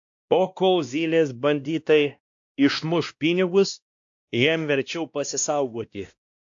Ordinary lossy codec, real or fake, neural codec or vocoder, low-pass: AAC, 64 kbps; fake; codec, 16 kHz, 1 kbps, X-Codec, WavLM features, trained on Multilingual LibriSpeech; 7.2 kHz